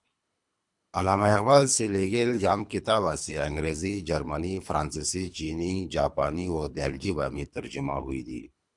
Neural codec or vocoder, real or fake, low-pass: codec, 24 kHz, 3 kbps, HILCodec; fake; 10.8 kHz